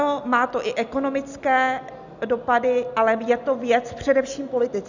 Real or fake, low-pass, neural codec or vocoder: real; 7.2 kHz; none